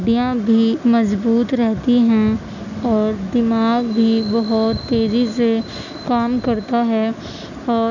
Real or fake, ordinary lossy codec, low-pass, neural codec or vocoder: real; none; 7.2 kHz; none